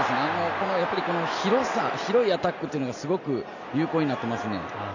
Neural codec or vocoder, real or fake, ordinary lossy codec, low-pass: none; real; none; 7.2 kHz